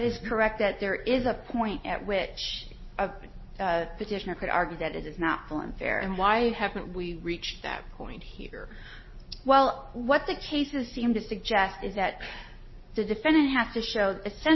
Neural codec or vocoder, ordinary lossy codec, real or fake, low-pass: none; MP3, 24 kbps; real; 7.2 kHz